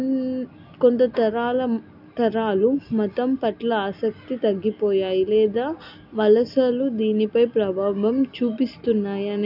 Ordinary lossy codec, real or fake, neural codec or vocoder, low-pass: AAC, 48 kbps; real; none; 5.4 kHz